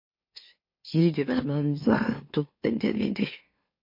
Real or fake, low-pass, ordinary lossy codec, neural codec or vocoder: fake; 5.4 kHz; MP3, 32 kbps; autoencoder, 44.1 kHz, a latent of 192 numbers a frame, MeloTTS